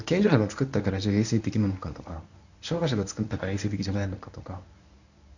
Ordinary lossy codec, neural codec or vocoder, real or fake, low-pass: none; codec, 24 kHz, 0.9 kbps, WavTokenizer, medium speech release version 1; fake; 7.2 kHz